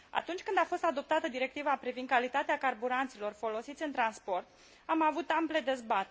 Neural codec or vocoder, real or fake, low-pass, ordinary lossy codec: none; real; none; none